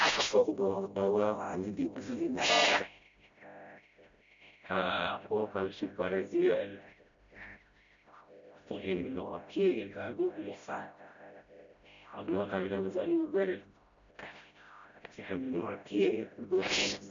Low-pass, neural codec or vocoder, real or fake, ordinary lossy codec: 7.2 kHz; codec, 16 kHz, 0.5 kbps, FreqCodec, smaller model; fake; MP3, 64 kbps